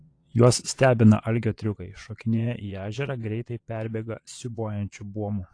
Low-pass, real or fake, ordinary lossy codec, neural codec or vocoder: 9.9 kHz; fake; AAC, 48 kbps; vocoder, 22.05 kHz, 80 mel bands, Vocos